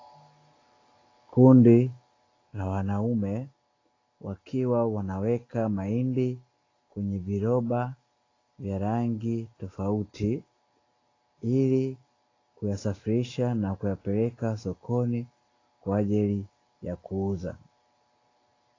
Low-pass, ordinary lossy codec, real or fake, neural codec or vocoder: 7.2 kHz; AAC, 32 kbps; real; none